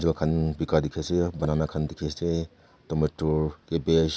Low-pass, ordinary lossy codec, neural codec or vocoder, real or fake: none; none; none; real